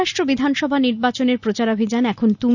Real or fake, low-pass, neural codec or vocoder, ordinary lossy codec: real; 7.2 kHz; none; none